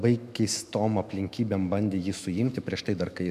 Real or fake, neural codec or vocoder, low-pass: fake; autoencoder, 48 kHz, 128 numbers a frame, DAC-VAE, trained on Japanese speech; 14.4 kHz